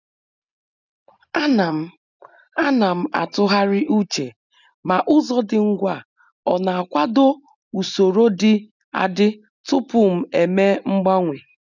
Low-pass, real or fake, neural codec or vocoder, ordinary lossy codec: 7.2 kHz; real; none; none